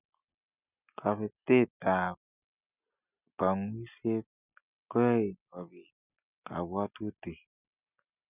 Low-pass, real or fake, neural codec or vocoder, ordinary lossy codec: 3.6 kHz; real; none; none